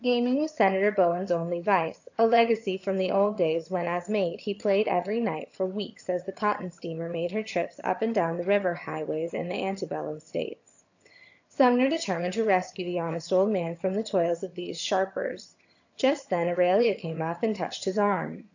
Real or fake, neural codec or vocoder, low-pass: fake; vocoder, 22.05 kHz, 80 mel bands, HiFi-GAN; 7.2 kHz